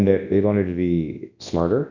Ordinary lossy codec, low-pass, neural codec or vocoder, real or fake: AAC, 48 kbps; 7.2 kHz; codec, 24 kHz, 0.9 kbps, WavTokenizer, large speech release; fake